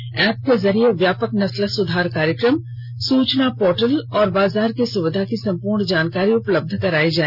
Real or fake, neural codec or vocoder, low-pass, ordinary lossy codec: real; none; 5.4 kHz; none